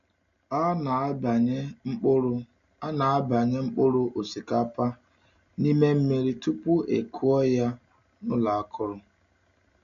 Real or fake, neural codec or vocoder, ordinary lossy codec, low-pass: real; none; AAC, 96 kbps; 7.2 kHz